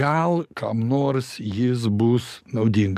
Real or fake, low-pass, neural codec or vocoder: fake; 14.4 kHz; codec, 44.1 kHz, 7.8 kbps, DAC